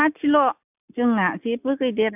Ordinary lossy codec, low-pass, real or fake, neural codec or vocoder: none; 3.6 kHz; real; none